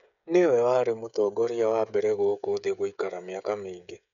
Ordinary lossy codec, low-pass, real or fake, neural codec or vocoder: none; 7.2 kHz; fake; codec, 16 kHz, 16 kbps, FreqCodec, smaller model